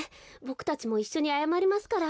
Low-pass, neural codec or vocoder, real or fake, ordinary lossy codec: none; none; real; none